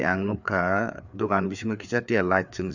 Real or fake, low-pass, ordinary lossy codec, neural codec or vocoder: fake; 7.2 kHz; none; codec, 16 kHz, 4 kbps, FreqCodec, larger model